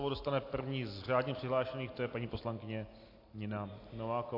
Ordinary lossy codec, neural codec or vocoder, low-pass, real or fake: AAC, 32 kbps; none; 5.4 kHz; real